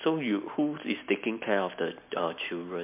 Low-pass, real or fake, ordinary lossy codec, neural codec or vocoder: 3.6 kHz; real; MP3, 32 kbps; none